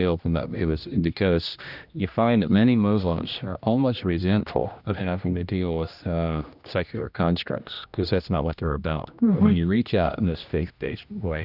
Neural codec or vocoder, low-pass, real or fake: codec, 16 kHz, 1 kbps, X-Codec, HuBERT features, trained on general audio; 5.4 kHz; fake